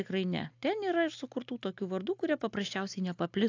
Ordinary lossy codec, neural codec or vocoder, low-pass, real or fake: AAC, 48 kbps; none; 7.2 kHz; real